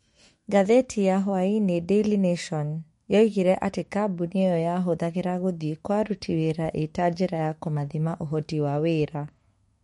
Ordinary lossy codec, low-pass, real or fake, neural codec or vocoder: MP3, 48 kbps; 19.8 kHz; fake; autoencoder, 48 kHz, 128 numbers a frame, DAC-VAE, trained on Japanese speech